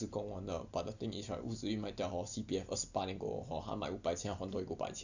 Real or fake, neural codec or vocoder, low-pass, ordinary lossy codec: real; none; 7.2 kHz; none